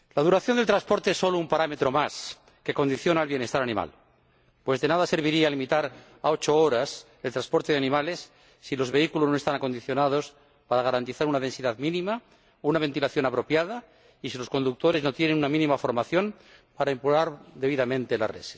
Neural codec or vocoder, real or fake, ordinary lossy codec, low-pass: none; real; none; none